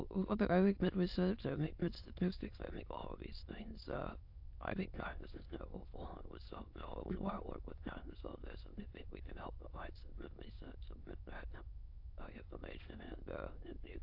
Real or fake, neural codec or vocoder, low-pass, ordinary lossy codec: fake; autoencoder, 22.05 kHz, a latent of 192 numbers a frame, VITS, trained on many speakers; 5.4 kHz; AAC, 48 kbps